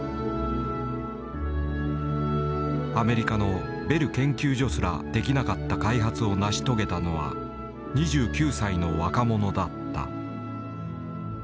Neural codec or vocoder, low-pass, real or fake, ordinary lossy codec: none; none; real; none